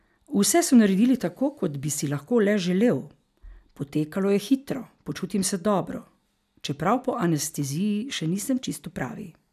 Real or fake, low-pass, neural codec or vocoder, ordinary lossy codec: real; 14.4 kHz; none; none